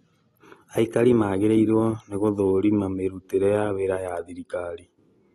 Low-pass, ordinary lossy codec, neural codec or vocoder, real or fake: 19.8 kHz; AAC, 32 kbps; none; real